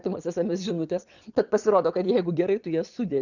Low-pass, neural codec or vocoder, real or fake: 7.2 kHz; vocoder, 22.05 kHz, 80 mel bands, Vocos; fake